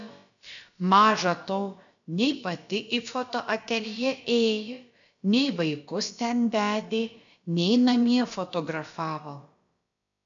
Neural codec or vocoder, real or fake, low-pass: codec, 16 kHz, about 1 kbps, DyCAST, with the encoder's durations; fake; 7.2 kHz